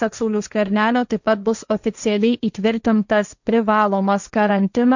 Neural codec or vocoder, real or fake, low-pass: codec, 16 kHz, 1.1 kbps, Voila-Tokenizer; fake; 7.2 kHz